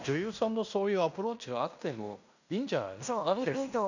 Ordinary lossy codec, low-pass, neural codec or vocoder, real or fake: none; 7.2 kHz; codec, 16 kHz in and 24 kHz out, 0.9 kbps, LongCat-Audio-Codec, fine tuned four codebook decoder; fake